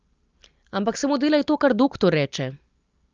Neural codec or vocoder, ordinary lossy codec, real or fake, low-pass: none; Opus, 24 kbps; real; 7.2 kHz